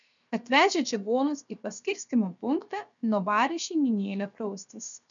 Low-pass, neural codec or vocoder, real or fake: 7.2 kHz; codec, 16 kHz, 0.7 kbps, FocalCodec; fake